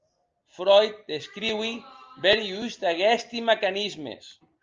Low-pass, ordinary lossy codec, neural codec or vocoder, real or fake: 7.2 kHz; Opus, 24 kbps; none; real